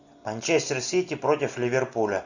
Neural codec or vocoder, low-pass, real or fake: none; 7.2 kHz; real